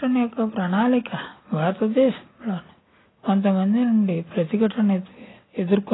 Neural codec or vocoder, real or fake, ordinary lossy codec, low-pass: none; real; AAC, 16 kbps; 7.2 kHz